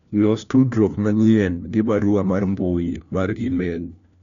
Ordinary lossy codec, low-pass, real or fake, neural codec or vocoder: none; 7.2 kHz; fake; codec, 16 kHz, 1 kbps, FunCodec, trained on LibriTTS, 50 frames a second